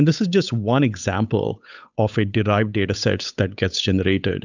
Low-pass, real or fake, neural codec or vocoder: 7.2 kHz; fake; codec, 16 kHz, 8 kbps, FunCodec, trained on Chinese and English, 25 frames a second